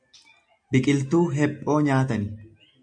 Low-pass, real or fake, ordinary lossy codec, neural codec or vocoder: 9.9 kHz; real; AAC, 64 kbps; none